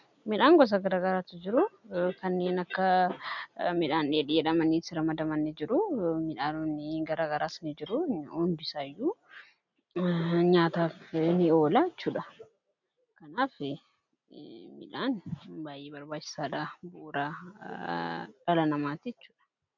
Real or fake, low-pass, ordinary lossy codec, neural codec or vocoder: real; 7.2 kHz; MP3, 64 kbps; none